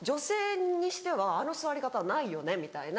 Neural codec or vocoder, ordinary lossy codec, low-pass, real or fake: none; none; none; real